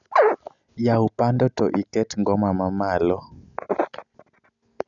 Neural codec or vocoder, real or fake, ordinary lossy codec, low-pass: none; real; none; 7.2 kHz